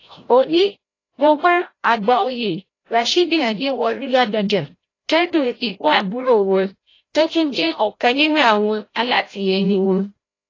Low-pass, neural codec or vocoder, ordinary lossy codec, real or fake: 7.2 kHz; codec, 16 kHz, 0.5 kbps, FreqCodec, larger model; AAC, 32 kbps; fake